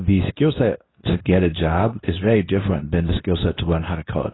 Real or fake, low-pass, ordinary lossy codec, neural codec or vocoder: fake; 7.2 kHz; AAC, 16 kbps; codec, 24 kHz, 0.9 kbps, WavTokenizer, medium speech release version 2